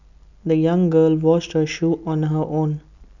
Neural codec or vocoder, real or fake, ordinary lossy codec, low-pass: none; real; none; 7.2 kHz